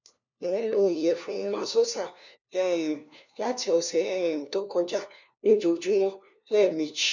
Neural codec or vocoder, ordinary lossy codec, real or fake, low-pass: codec, 16 kHz, 1 kbps, FunCodec, trained on LibriTTS, 50 frames a second; none; fake; 7.2 kHz